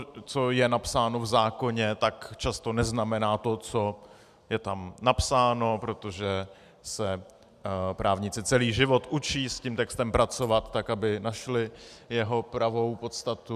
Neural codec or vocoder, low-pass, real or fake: none; 14.4 kHz; real